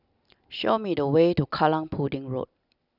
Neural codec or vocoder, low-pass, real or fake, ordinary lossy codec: none; 5.4 kHz; real; none